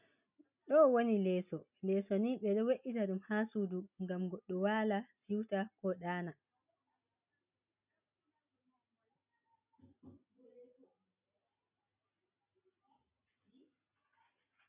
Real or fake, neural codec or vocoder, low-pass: real; none; 3.6 kHz